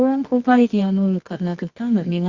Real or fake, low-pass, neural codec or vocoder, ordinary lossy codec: fake; 7.2 kHz; codec, 24 kHz, 0.9 kbps, WavTokenizer, medium music audio release; Opus, 64 kbps